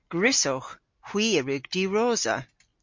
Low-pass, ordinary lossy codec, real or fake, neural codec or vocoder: 7.2 kHz; MP3, 48 kbps; real; none